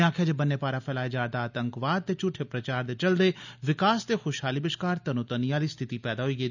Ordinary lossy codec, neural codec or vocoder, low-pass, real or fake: none; none; 7.2 kHz; real